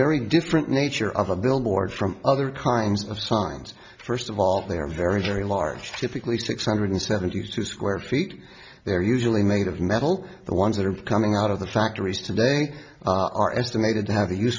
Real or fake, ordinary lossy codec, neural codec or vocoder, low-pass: real; MP3, 64 kbps; none; 7.2 kHz